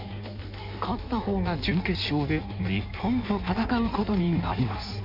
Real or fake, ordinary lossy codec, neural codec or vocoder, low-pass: fake; MP3, 48 kbps; codec, 16 kHz in and 24 kHz out, 1.1 kbps, FireRedTTS-2 codec; 5.4 kHz